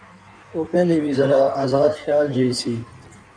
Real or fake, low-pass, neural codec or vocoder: fake; 9.9 kHz; codec, 16 kHz in and 24 kHz out, 1.1 kbps, FireRedTTS-2 codec